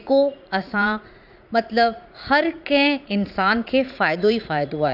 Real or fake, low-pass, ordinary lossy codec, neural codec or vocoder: fake; 5.4 kHz; none; vocoder, 44.1 kHz, 80 mel bands, Vocos